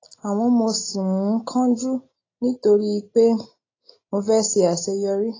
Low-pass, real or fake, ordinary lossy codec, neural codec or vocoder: 7.2 kHz; real; AAC, 32 kbps; none